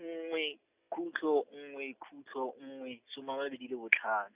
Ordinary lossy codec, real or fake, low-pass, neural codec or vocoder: Opus, 64 kbps; real; 3.6 kHz; none